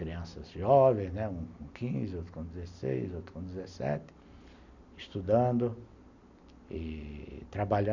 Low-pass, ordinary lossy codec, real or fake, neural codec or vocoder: 7.2 kHz; none; real; none